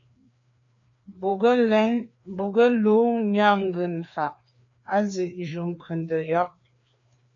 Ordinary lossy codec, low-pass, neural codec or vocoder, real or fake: AAC, 48 kbps; 7.2 kHz; codec, 16 kHz, 2 kbps, FreqCodec, larger model; fake